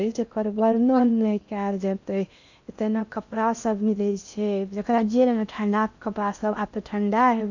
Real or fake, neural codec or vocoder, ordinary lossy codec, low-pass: fake; codec, 16 kHz in and 24 kHz out, 0.6 kbps, FocalCodec, streaming, 2048 codes; none; 7.2 kHz